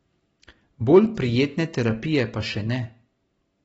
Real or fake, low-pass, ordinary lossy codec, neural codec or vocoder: real; 19.8 kHz; AAC, 24 kbps; none